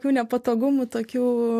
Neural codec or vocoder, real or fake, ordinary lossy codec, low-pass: none; real; AAC, 64 kbps; 14.4 kHz